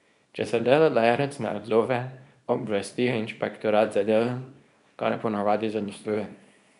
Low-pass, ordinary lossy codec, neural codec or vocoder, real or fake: 10.8 kHz; none; codec, 24 kHz, 0.9 kbps, WavTokenizer, small release; fake